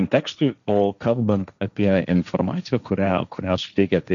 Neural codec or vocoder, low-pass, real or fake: codec, 16 kHz, 1.1 kbps, Voila-Tokenizer; 7.2 kHz; fake